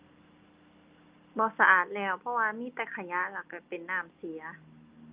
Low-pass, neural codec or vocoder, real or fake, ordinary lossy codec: 3.6 kHz; none; real; Opus, 24 kbps